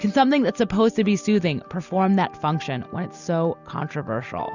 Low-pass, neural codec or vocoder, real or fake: 7.2 kHz; none; real